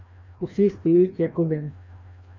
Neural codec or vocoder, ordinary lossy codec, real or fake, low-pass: codec, 16 kHz, 1 kbps, FreqCodec, larger model; AAC, 48 kbps; fake; 7.2 kHz